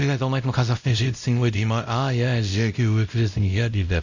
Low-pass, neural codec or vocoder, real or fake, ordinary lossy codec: 7.2 kHz; codec, 16 kHz, 0.5 kbps, X-Codec, WavLM features, trained on Multilingual LibriSpeech; fake; none